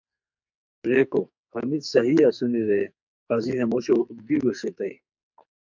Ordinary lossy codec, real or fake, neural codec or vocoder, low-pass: MP3, 64 kbps; fake; codec, 44.1 kHz, 2.6 kbps, SNAC; 7.2 kHz